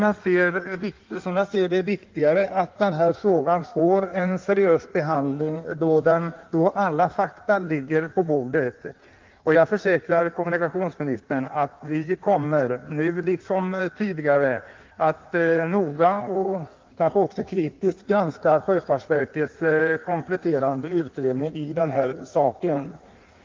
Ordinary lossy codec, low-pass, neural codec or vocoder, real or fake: Opus, 32 kbps; 7.2 kHz; codec, 16 kHz in and 24 kHz out, 1.1 kbps, FireRedTTS-2 codec; fake